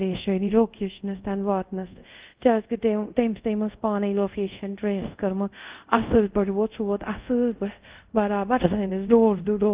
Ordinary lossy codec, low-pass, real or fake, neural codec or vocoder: Opus, 32 kbps; 3.6 kHz; fake; codec, 24 kHz, 0.5 kbps, DualCodec